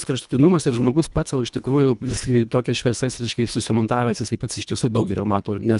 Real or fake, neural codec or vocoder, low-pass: fake; codec, 24 kHz, 1.5 kbps, HILCodec; 10.8 kHz